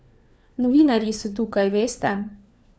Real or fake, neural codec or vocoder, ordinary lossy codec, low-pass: fake; codec, 16 kHz, 4 kbps, FunCodec, trained on LibriTTS, 50 frames a second; none; none